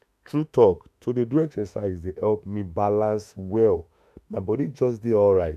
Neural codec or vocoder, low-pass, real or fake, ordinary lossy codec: autoencoder, 48 kHz, 32 numbers a frame, DAC-VAE, trained on Japanese speech; 14.4 kHz; fake; none